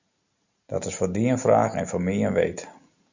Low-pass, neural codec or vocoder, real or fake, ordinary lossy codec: 7.2 kHz; none; real; Opus, 64 kbps